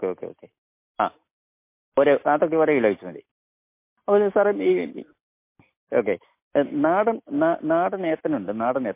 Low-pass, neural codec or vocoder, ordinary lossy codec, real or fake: 3.6 kHz; none; MP3, 24 kbps; real